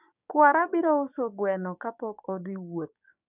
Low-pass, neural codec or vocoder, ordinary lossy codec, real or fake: 3.6 kHz; codec, 16 kHz, 6 kbps, DAC; none; fake